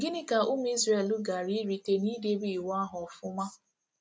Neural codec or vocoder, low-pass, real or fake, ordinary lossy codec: none; none; real; none